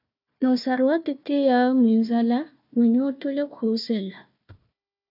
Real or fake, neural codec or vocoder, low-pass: fake; codec, 16 kHz, 1 kbps, FunCodec, trained on Chinese and English, 50 frames a second; 5.4 kHz